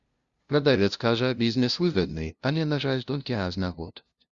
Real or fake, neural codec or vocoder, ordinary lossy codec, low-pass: fake; codec, 16 kHz, 0.5 kbps, FunCodec, trained on LibriTTS, 25 frames a second; Opus, 64 kbps; 7.2 kHz